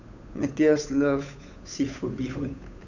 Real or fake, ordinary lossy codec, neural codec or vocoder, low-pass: fake; none; codec, 16 kHz, 8 kbps, FunCodec, trained on Chinese and English, 25 frames a second; 7.2 kHz